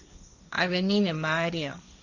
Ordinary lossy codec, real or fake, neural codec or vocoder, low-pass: none; fake; codec, 16 kHz, 1.1 kbps, Voila-Tokenizer; 7.2 kHz